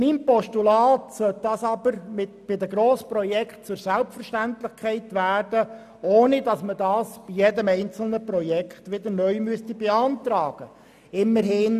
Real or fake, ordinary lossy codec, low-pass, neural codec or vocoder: real; none; 14.4 kHz; none